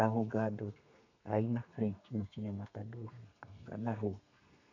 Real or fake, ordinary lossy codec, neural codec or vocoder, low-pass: fake; none; codec, 32 kHz, 1.9 kbps, SNAC; 7.2 kHz